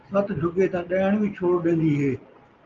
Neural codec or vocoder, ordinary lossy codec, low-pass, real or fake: none; Opus, 32 kbps; 7.2 kHz; real